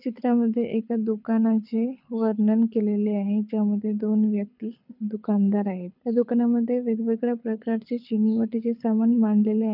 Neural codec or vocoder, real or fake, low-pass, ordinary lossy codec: codec, 24 kHz, 6 kbps, HILCodec; fake; 5.4 kHz; none